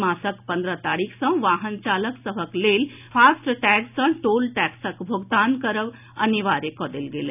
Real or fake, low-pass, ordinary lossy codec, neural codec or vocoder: real; 3.6 kHz; none; none